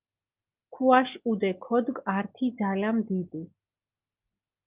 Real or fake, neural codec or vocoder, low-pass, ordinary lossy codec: real; none; 3.6 kHz; Opus, 32 kbps